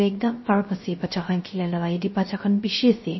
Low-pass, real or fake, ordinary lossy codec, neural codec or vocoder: 7.2 kHz; fake; MP3, 24 kbps; codec, 16 kHz, 0.3 kbps, FocalCodec